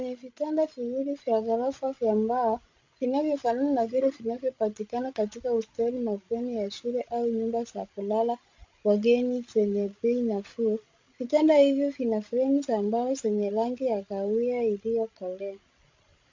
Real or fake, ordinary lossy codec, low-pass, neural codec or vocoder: fake; MP3, 48 kbps; 7.2 kHz; codec, 16 kHz, 16 kbps, FreqCodec, larger model